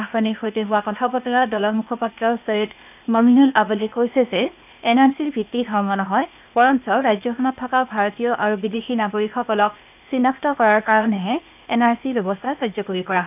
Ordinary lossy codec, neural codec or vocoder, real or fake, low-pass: none; codec, 16 kHz, 0.8 kbps, ZipCodec; fake; 3.6 kHz